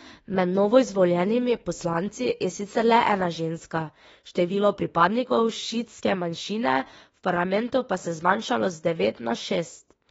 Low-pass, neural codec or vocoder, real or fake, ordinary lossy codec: 19.8 kHz; autoencoder, 48 kHz, 32 numbers a frame, DAC-VAE, trained on Japanese speech; fake; AAC, 24 kbps